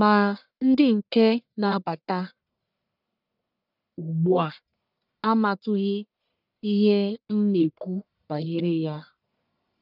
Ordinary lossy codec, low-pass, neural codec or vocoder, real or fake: none; 5.4 kHz; codec, 44.1 kHz, 1.7 kbps, Pupu-Codec; fake